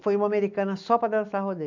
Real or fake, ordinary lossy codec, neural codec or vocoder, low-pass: real; none; none; 7.2 kHz